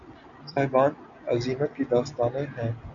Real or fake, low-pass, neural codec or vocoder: real; 7.2 kHz; none